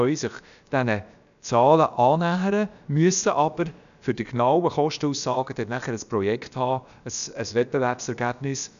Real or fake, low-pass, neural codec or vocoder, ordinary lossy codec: fake; 7.2 kHz; codec, 16 kHz, about 1 kbps, DyCAST, with the encoder's durations; none